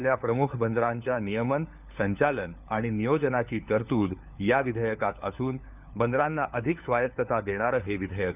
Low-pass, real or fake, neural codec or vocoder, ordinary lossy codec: 3.6 kHz; fake; codec, 16 kHz, 4 kbps, FunCodec, trained on LibriTTS, 50 frames a second; none